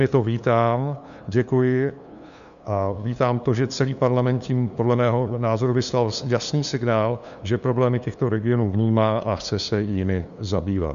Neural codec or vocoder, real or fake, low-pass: codec, 16 kHz, 2 kbps, FunCodec, trained on LibriTTS, 25 frames a second; fake; 7.2 kHz